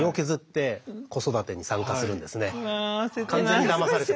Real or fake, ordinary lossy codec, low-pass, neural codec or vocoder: real; none; none; none